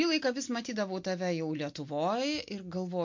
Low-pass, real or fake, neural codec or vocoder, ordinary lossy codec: 7.2 kHz; real; none; MP3, 48 kbps